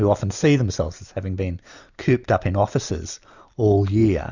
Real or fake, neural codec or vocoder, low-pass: real; none; 7.2 kHz